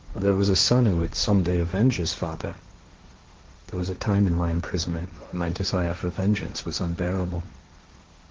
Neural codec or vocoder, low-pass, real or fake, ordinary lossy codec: codec, 16 kHz, 1.1 kbps, Voila-Tokenizer; 7.2 kHz; fake; Opus, 16 kbps